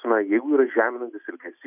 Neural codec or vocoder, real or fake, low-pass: none; real; 3.6 kHz